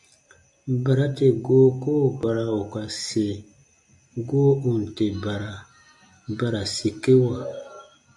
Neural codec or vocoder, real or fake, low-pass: none; real; 10.8 kHz